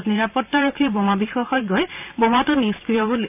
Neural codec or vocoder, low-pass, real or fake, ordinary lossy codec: vocoder, 44.1 kHz, 128 mel bands every 512 samples, BigVGAN v2; 3.6 kHz; fake; none